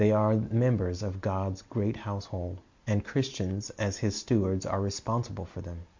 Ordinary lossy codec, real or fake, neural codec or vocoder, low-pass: MP3, 48 kbps; real; none; 7.2 kHz